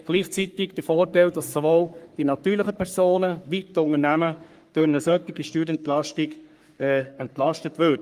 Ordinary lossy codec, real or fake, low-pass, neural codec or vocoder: Opus, 32 kbps; fake; 14.4 kHz; codec, 44.1 kHz, 3.4 kbps, Pupu-Codec